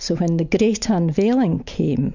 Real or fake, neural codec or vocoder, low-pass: real; none; 7.2 kHz